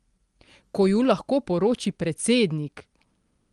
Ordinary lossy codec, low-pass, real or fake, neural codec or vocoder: Opus, 24 kbps; 10.8 kHz; real; none